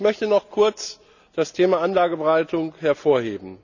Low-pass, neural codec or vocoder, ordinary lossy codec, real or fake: 7.2 kHz; none; none; real